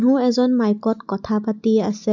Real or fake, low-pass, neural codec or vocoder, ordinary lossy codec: real; 7.2 kHz; none; none